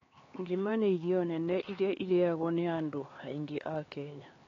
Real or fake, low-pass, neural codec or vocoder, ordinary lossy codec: fake; 7.2 kHz; codec, 16 kHz, 4 kbps, X-Codec, HuBERT features, trained on LibriSpeech; AAC, 32 kbps